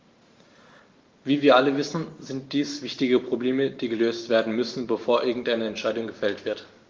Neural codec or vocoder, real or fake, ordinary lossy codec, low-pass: vocoder, 44.1 kHz, 128 mel bands every 512 samples, BigVGAN v2; fake; Opus, 32 kbps; 7.2 kHz